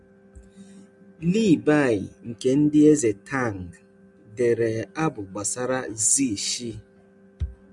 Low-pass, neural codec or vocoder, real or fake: 10.8 kHz; none; real